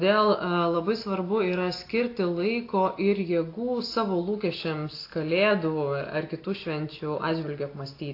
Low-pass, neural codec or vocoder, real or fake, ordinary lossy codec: 5.4 kHz; none; real; AAC, 32 kbps